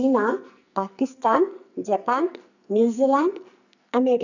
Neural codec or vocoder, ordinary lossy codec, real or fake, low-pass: codec, 44.1 kHz, 2.6 kbps, SNAC; none; fake; 7.2 kHz